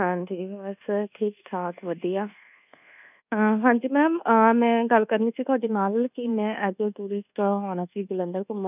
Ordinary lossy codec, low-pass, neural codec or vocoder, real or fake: none; 3.6 kHz; codec, 24 kHz, 1.2 kbps, DualCodec; fake